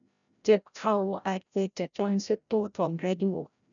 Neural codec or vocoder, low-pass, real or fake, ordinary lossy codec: codec, 16 kHz, 0.5 kbps, FreqCodec, larger model; 7.2 kHz; fake; none